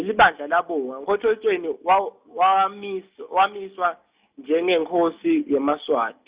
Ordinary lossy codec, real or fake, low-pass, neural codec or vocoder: Opus, 64 kbps; real; 3.6 kHz; none